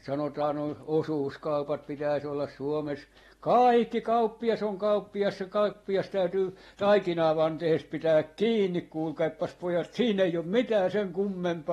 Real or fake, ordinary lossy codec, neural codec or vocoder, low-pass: real; AAC, 32 kbps; none; 19.8 kHz